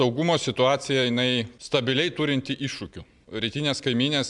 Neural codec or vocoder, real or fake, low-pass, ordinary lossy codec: none; real; 10.8 kHz; MP3, 96 kbps